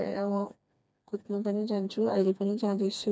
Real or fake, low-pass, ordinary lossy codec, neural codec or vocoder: fake; none; none; codec, 16 kHz, 2 kbps, FreqCodec, smaller model